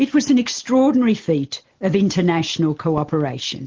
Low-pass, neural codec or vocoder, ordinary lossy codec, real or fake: 7.2 kHz; none; Opus, 16 kbps; real